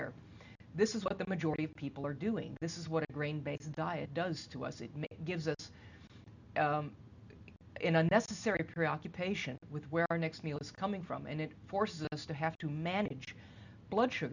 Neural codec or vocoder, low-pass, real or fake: none; 7.2 kHz; real